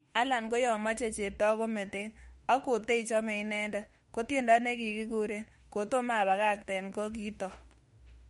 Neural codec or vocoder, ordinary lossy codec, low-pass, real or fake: autoencoder, 48 kHz, 32 numbers a frame, DAC-VAE, trained on Japanese speech; MP3, 48 kbps; 19.8 kHz; fake